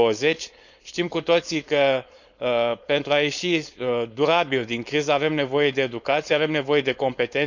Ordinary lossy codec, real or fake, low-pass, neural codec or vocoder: none; fake; 7.2 kHz; codec, 16 kHz, 4.8 kbps, FACodec